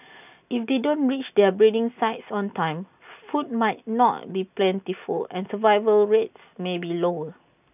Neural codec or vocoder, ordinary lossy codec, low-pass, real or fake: none; none; 3.6 kHz; real